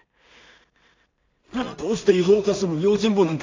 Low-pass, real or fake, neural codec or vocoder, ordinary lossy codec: 7.2 kHz; fake; codec, 16 kHz in and 24 kHz out, 0.4 kbps, LongCat-Audio-Codec, two codebook decoder; MP3, 64 kbps